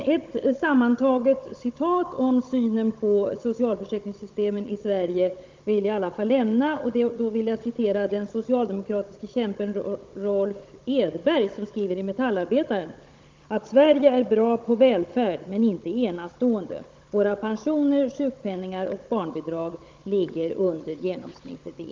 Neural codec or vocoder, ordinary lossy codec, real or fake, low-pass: codec, 16 kHz, 16 kbps, FunCodec, trained on Chinese and English, 50 frames a second; Opus, 24 kbps; fake; 7.2 kHz